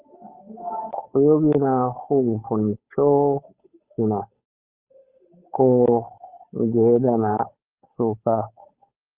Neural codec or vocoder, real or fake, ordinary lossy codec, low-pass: codec, 16 kHz, 8 kbps, FunCodec, trained on Chinese and English, 25 frames a second; fake; Opus, 32 kbps; 3.6 kHz